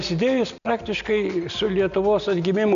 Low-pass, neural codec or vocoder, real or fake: 7.2 kHz; none; real